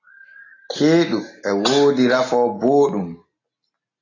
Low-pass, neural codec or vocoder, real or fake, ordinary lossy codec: 7.2 kHz; none; real; AAC, 32 kbps